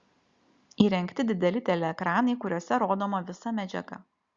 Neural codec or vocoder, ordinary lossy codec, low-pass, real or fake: none; Opus, 64 kbps; 7.2 kHz; real